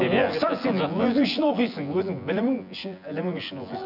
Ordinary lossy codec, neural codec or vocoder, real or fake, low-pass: none; vocoder, 24 kHz, 100 mel bands, Vocos; fake; 5.4 kHz